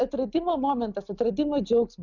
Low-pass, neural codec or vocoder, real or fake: 7.2 kHz; none; real